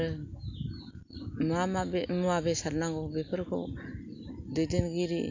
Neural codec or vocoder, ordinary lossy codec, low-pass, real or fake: none; AAC, 48 kbps; 7.2 kHz; real